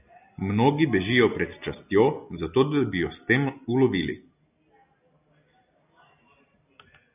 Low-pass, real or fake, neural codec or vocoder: 3.6 kHz; real; none